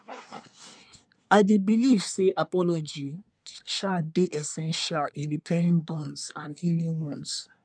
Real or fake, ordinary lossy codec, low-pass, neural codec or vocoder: fake; none; 9.9 kHz; codec, 24 kHz, 1 kbps, SNAC